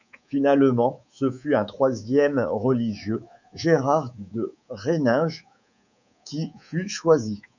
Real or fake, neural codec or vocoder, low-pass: fake; codec, 24 kHz, 3.1 kbps, DualCodec; 7.2 kHz